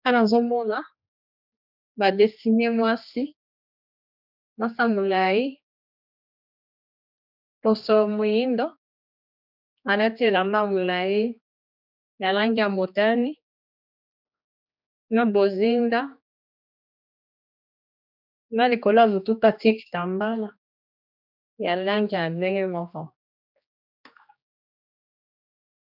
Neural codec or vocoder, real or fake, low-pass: codec, 16 kHz, 2 kbps, X-Codec, HuBERT features, trained on general audio; fake; 5.4 kHz